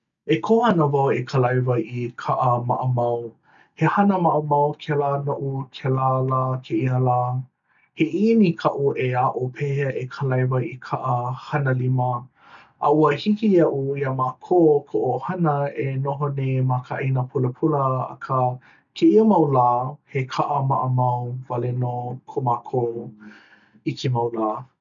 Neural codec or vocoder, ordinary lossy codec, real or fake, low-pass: none; none; real; 7.2 kHz